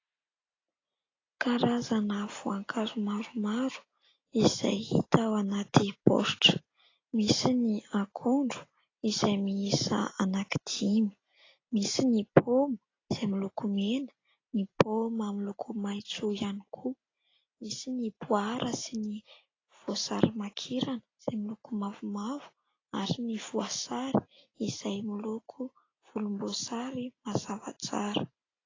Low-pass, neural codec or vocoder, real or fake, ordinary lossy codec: 7.2 kHz; none; real; AAC, 32 kbps